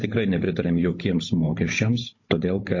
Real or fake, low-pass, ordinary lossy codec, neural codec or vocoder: fake; 7.2 kHz; MP3, 32 kbps; codec, 16 kHz, 16 kbps, FunCodec, trained on Chinese and English, 50 frames a second